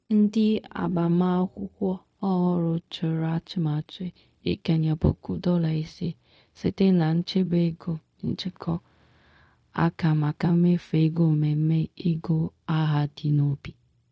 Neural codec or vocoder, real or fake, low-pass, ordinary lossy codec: codec, 16 kHz, 0.4 kbps, LongCat-Audio-Codec; fake; none; none